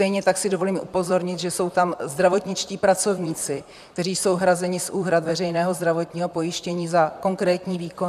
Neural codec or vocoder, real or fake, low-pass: vocoder, 44.1 kHz, 128 mel bands, Pupu-Vocoder; fake; 14.4 kHz